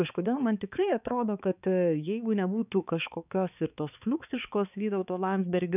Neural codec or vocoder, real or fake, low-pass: codec, 16 kHz, 4 kbps, X-Codec, HuBERT features, trained on balanced general audio; fake; 3.6 kHz